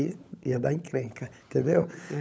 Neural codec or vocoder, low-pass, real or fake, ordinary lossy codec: codec, 16 kHz, 16 kbps, FunCodec, trained on LibriTTS, 50 frames a second; none; fake; none